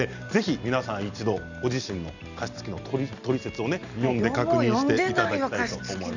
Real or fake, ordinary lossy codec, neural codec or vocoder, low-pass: real; none; none; 7.2 kHz